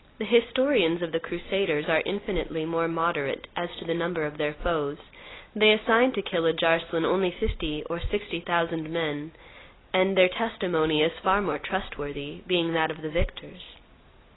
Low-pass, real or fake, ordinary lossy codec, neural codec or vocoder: 7.2 kHz; real; AAC, 16 kbps; none